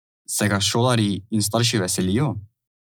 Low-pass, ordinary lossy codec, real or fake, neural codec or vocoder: none; none; real; none